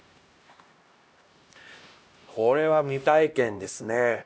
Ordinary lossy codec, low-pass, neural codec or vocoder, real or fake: none; none; codec, 16 kHz, 1 kbps, X-Codec, HuBERT features, trained on LibriSpeech; fake